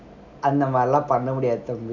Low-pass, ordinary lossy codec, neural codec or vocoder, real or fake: 7.2 kHz; none; none; real